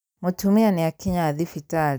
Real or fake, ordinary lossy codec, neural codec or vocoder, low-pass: real; none; none; none